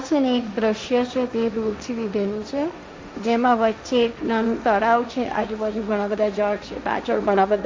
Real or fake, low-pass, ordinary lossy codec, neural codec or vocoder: fake; none; none; codec, 16 kHz, 1.1 kbps, Voila-Tokenizer